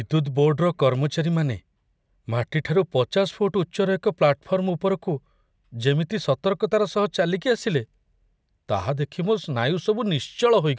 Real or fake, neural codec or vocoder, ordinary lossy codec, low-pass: real; none; none; none